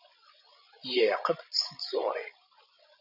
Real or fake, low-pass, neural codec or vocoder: fake; 5.4 kHz; codec, 16 kHz, 16 kbps, FreqCodec, larger model